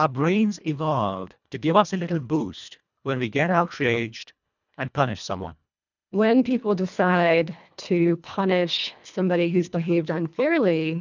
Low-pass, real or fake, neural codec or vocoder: 7.2 kHz; fake; codec, 24 kHz, 1.5 kbps, HILCodec